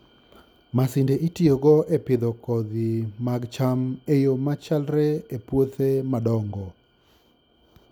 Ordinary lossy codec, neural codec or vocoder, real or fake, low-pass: none; none; real; 19.8 kHz